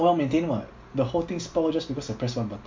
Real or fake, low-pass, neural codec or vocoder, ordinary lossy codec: real; 7.2 kHz; none; MP3, 48 kbps